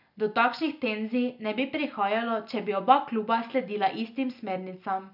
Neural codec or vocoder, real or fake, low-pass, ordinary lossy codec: none; real; 5.4 kHz; none